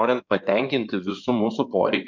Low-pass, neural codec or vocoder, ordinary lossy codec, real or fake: 7.2 kHz; codec, 16 kHz in and 24 kHz out, 2.2 kbps, FireRedTTS-2 codec; MP3, 64 kbps; fake